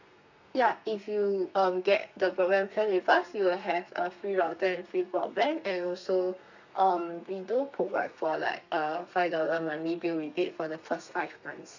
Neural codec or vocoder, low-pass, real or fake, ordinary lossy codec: codec, 32 kHz, 1.9 kbps, SNAC; 7.2 kHz; fake; none